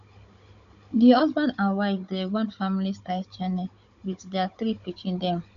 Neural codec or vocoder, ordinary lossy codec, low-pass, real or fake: codec, 16 kHz, 16 kbps, FunCodec, trained on Chinese and English, 50 frames a second; none; 7.2 kHz; fake